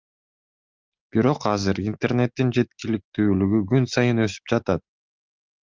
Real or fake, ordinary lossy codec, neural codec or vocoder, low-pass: real; Opus, 32 kbps; none; 7.2 kHz